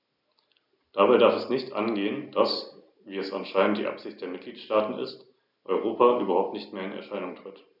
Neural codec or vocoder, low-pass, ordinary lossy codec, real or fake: none; 5.4 kHz; none; real